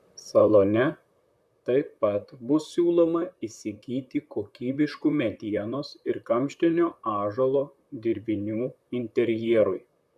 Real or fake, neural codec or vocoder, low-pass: fake; vocoder, 44.1 kHz, 128 mel bands, Pupu-Vocoder; 14.4 kHz